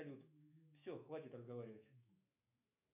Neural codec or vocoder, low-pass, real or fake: autoencoder, 48 kHz, 128 numbers a frame, DAC-VAE, trained on Japanese speech; 3.6 kHz; fake